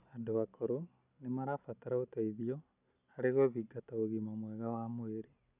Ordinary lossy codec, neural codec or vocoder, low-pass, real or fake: none; none; 3.6 kHz; real